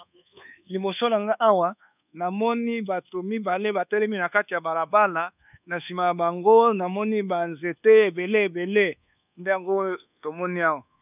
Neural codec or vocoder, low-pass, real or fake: codec, 24 kHz, 1.2 kbps, DualCodec; 3.6 kHz; fake